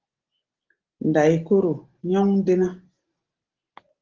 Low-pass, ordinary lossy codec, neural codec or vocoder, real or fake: 7.2 kHz; Opus, 16 kbps; none; real